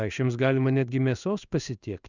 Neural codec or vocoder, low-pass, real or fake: codec, 16 kHz in and 24 kHz out, 1 kbps, XY-Tokenizer; 7.2 kHz; fake